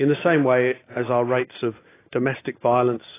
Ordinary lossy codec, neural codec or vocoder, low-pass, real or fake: AAC, 16 kbps; none; 3.6 kHz; real